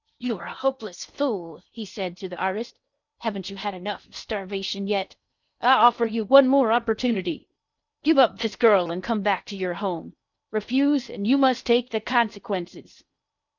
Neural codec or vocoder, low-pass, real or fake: codec, 16 kHz in and 24 kHz out, 0.8 kbps, FocalCodec, streaming, 65536 codes; 7.2 kHz; fake